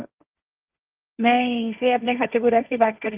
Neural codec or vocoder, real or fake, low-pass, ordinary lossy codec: codec, 16 kHz, 1.1 kbps, Voila-Tokenizer; fake; 3.6 kHz; Opus, 24 kbps